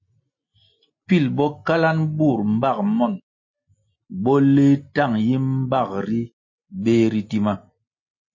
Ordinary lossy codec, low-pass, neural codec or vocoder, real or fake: MP3, 32 kbps; 7.2 kHz; none; real